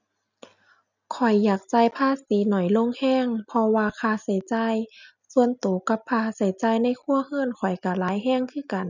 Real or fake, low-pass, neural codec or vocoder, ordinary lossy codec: real; 7.2 kHz; none; none